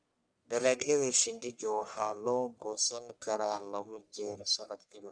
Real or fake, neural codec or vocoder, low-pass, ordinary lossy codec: fake; codec, 44.1 kHz, 1.7 kbps, Pupu-Codec; 9.9 kHz; none